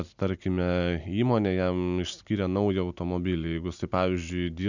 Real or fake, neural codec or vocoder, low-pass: real; none; 7.2 kHz